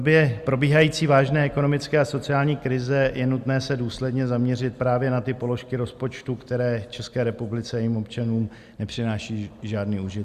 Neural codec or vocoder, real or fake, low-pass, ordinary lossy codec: none; real; 14.4 kHz; Opus, 64 kbps